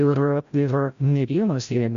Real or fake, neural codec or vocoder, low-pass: fake; codec, 16 kHz, 0.5 kbps, FreqCodec, larger model; 7.2 kHz